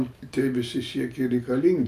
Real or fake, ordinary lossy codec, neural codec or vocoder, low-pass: real; MP3, 96 kbps; none; 14.4 kHz